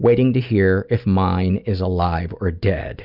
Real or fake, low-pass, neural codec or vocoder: real; 5.4 kHz; none